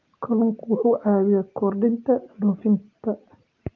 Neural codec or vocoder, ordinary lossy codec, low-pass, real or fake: none; Opus, 32 kbps; 7.2 kHz; real